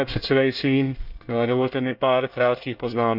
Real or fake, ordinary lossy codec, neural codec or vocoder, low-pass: fake; none; codec, 24 kHz, 1 kbps, SNAC; 5.4 kHz